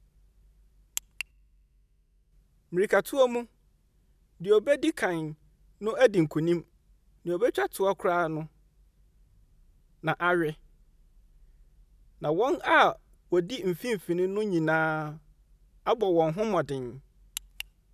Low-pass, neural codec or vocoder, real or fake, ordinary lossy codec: 14.4 kHz; none; real; none